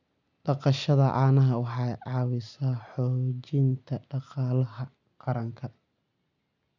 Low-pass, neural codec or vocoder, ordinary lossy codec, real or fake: 7.2 kHz; none; none; real